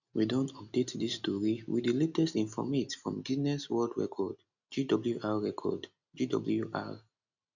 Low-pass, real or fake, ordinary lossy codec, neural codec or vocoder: 7.2 kHz; real; none; none